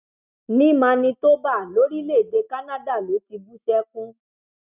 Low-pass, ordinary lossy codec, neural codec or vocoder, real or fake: 3.6 kHz; none; none; real